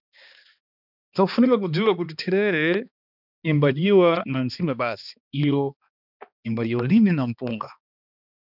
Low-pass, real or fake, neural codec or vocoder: 5.4 kHz; fake; codec, 16 kHz, 2 kbps, X-Codec, HuBERT features, trained on balanced general audio